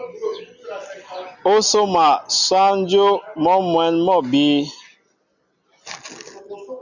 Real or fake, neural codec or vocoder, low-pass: real; none; 7.2 kHz